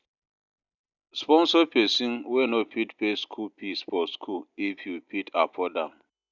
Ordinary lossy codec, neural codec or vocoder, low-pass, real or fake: none; none; 7.2 kHz; real